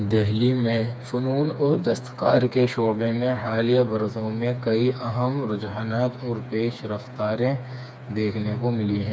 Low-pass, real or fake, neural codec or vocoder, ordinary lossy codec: none; fake; codec, 16 kHz, 4 kbps, FreqCodec, smaller model; none